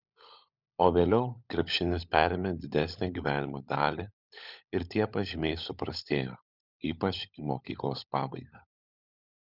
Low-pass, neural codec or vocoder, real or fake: 5.4 kHz; codec, 16 kHz, 16 kbps, FunCodec, trained on LibriTTS, 50 frames a second; fake